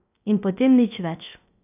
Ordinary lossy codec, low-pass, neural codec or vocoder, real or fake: AAC, 32 kbps; 3.6 kHz; codec, 16 kHz, 0.3 kbps, FocalCodec; fake